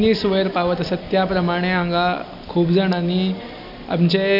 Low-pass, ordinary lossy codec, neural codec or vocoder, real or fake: 5.4 kHz; none; none; real